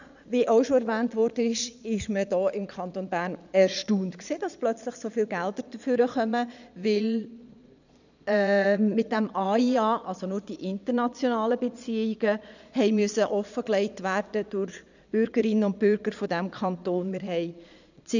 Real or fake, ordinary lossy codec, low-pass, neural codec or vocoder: fake; none; 7.2 kHz; vocoder, 22.05 kHz, 80 mel bands, Vocos